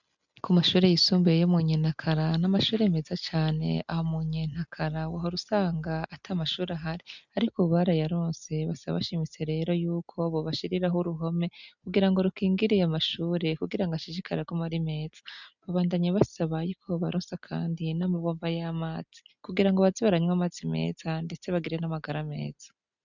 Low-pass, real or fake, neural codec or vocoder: 7.2 kHz; real; none